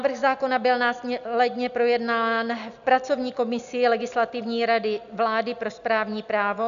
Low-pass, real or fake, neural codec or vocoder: 7.2 kHz; real; none